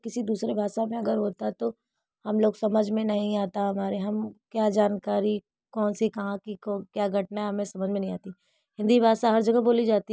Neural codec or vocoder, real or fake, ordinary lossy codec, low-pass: none; real; none; none